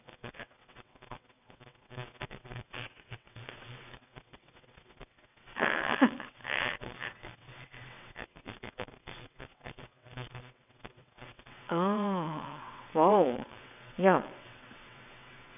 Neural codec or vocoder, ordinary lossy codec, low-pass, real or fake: vocoder, 22.05 kHz, 80 mel bands, WaveNeXt; none; 3.6 kHz; fake